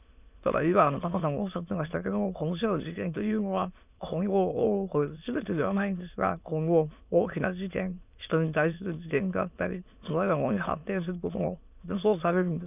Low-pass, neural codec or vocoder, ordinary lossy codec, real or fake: 3.6 kHz; autoencoder, 22.05 kHz, a latent of 192 numbers a frame, VITS, trained on many speakers; none; fake